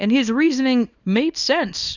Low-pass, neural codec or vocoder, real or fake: 7.2 kHz; codec, 24 kHz, 0.9 kbps, WavTokenizer, small release; fake